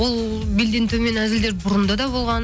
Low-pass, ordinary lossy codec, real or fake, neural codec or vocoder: none; none; real; none